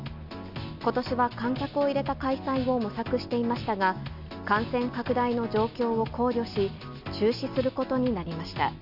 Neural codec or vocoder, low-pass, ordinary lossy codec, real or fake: none; 5.4 kHz; none; real